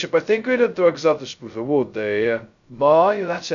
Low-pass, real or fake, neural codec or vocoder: 7.2 kHz; fake; codec, 16 kHz, 0.2 kbps, FocalCodec